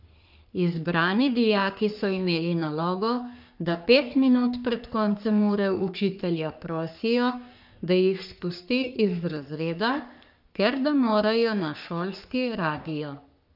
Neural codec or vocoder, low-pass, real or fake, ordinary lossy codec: codec, 44.1 kHz, 3.4 kbps, Pupu-Codec; 5.4 kHz; fake; none